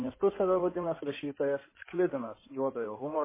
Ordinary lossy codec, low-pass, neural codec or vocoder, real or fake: MP3, 16 kbps; 3.6 kHz; codec, 16 kHz in and 24 kHz out, 2.2 kbps, FireRedTTS-2 codec; fake